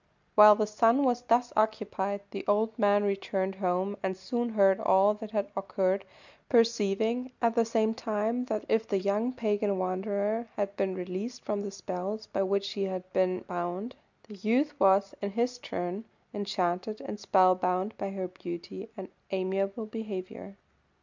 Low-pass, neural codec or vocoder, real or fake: 7.2 kHz; none; real